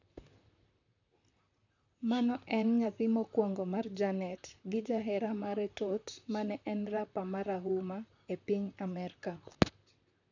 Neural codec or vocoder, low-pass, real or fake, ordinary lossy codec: vocoder, 44.1 kHz, 128 mel bands, Pupu-Vocoder; 7.2 kHz; fake; none